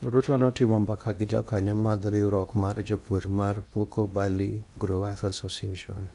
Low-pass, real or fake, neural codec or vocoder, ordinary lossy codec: 10.8 kHz; fake; codec, 16 kHz in and 24 kHz out, 0.8 kbps, FocalCodec, streaming, 65536 codes; none